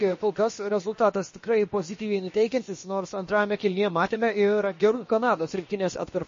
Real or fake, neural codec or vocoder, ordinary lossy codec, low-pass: fake; codec, 16 kHz, about 1 kbps, DyCAST, with the encoder's durations; MP3, 32 kbps; 7.2 kHz